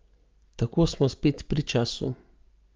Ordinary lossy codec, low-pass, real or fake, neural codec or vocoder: Opus, 32 kbps; 7.2 kHz; real; none